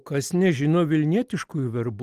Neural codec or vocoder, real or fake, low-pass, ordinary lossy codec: none; real; 14.4 kHz; Opus, 24 kbps